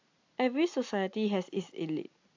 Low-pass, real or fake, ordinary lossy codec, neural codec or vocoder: 7.2 kHz; real; AAC, 48 kbps; none